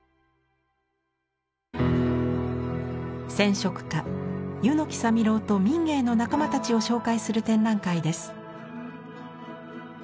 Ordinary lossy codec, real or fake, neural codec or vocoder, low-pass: none; real; none; none